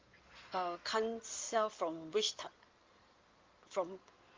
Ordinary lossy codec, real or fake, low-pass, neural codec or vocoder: Opus, 32 kbps; fake; 7.2 kHz; codec, 16 kHz in and 24 kHz out, 2.2 kbps, FireRedTTS-2 codec